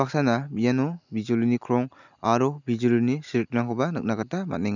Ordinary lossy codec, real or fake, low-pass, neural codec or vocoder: none; real; 7.2 kHz; none